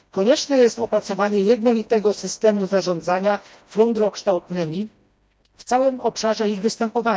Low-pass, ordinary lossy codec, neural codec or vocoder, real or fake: none; none; codec, 16 kHz, 1 kbps, FreqCodec, smaller model; fake